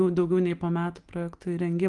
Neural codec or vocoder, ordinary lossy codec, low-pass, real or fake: none; Opus, 24 kbps; 10.8 kHz; real